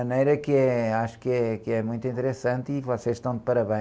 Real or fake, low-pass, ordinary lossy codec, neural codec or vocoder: real; none; none; none